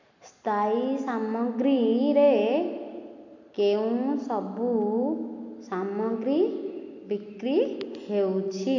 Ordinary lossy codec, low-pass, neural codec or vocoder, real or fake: none; 7.2 kHz; none; real